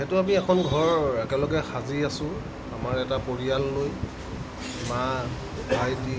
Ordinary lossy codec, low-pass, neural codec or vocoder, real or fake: none; none; none; real